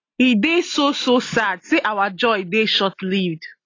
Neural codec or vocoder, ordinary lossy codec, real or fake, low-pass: none; AAC, 32 kbps; real; 7.2 kHz